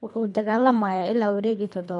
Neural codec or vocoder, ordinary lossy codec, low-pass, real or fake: codec, 24 kHz, 3 kbps, HILCodec; MP3, 64 kbps; 10.8 kHz; fake